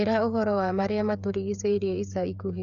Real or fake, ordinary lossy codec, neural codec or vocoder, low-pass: fake; none; codec, 16 kHz, 8 kbps, FreqCodec, smaller model; 7.2 kHz